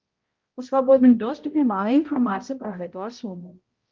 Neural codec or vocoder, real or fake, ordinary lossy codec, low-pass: codec, 16 kHz, 0.5 kbps, X-Codec, HuBERT features, trained on balanced general audio; fake; Opus, 32 kbps; 7.2 kHz